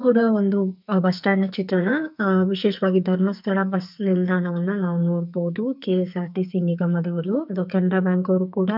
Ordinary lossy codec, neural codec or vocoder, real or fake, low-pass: none; codec, 44.1 kHz, 2.6 kbps, SNAC; fake; 5.4 kHz